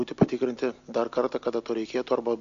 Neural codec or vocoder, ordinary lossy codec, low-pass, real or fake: none; AAC, 64 kbps; 7.2 kHz; real